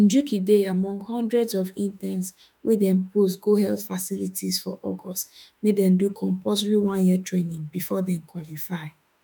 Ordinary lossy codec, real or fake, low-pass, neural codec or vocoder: none; fake; 19.8 kHz; autoencoder, 48 kHz, 32 numbers a frame, DAC-VAE, trained on Japanese speech